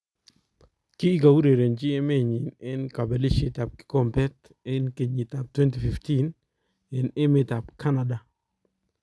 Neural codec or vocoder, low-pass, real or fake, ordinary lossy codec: none; none; real; none